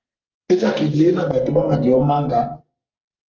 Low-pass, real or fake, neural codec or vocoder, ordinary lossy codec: 7.2 kHz; fake; codec, 44.1 kHz, 2.6 kbps, SNAC; Opus, 16 kbps